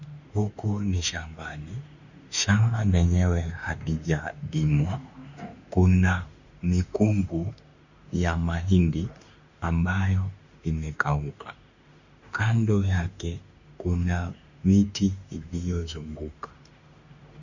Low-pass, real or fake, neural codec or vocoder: 7.2 kHz; fake; autoencoder, 48 kHz, 32 numbers a frame, DAC-VAE, trained on Japanese speech